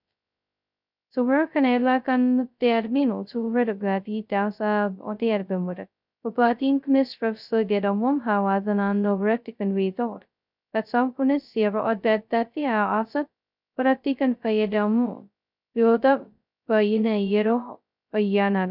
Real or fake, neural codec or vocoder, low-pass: fake; codec, 16 kHz, 0.2 kbps, FocalCodec; 5.4 kHz